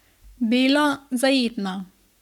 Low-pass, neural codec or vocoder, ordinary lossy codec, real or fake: 19.8 kHz; codec, 44.1 kHz, 7.8 kbps, Pupu-Codec; none; fake